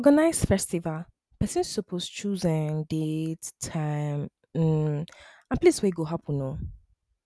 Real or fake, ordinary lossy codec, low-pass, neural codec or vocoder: real; none; none; none